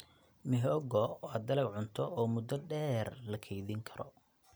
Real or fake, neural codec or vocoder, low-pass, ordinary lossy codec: real; none; none; none